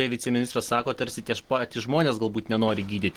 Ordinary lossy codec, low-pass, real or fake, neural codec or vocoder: Opus, 16 kbps; 19.8 kHz; real; none